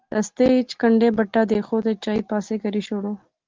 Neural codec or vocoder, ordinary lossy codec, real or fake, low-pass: none; Opus, 16 kbps; real; 7.2 kHz